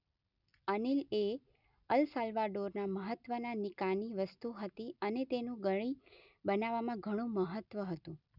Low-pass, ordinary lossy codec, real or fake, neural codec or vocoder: 5.4 kHz; none; real; none